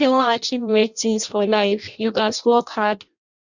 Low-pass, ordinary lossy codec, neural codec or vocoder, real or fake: 7.2 kHz; Opus, 64 kbps; codec, 16 kHz in and 24 kHz out, 0.6 kbps, FireRedTTS-2 codec; fake